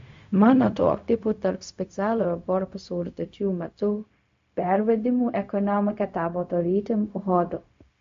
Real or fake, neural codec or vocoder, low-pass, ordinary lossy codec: fake; codec, 16 kHz, 0.4 kbps, LongCat-Audio-Codec; 7.2 kHz; MP3, 48 kbps